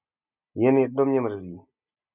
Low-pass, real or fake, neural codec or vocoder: 3.6 kHz; real; none